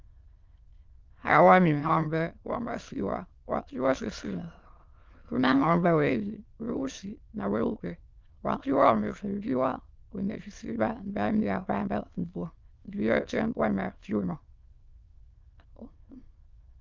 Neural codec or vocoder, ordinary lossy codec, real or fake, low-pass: autoencoder, 22.05 kHz, a latent of 192 numbers a frame, VITS, trained on many speakers; Opus, 32 kbps; fake; 7.2 kHz